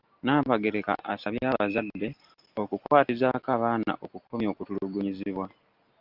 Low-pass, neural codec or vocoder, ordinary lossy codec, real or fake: 5.4 kHz; none; Opus, 24 kbps; real